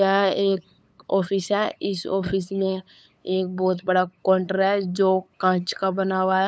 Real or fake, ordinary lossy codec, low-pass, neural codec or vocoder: fake; none; none; codec, 16 kHz, 8 kbps, FunCodec, trained on LibriTTS, 25 frames a second